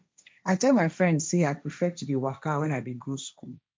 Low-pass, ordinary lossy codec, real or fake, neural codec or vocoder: none; none; fake; codec, 16 kHz, 1.1 kbps, Voila-Tokenizer